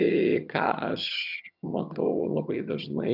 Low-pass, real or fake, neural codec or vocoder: 5.4 kHz; fake; vocoder, 22.05 kHz, 80 mel bands, HiFi-GAN